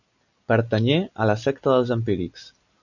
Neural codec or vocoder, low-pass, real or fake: none; 7.2 kHz; real